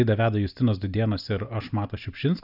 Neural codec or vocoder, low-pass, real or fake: none; 5.4 kHz; real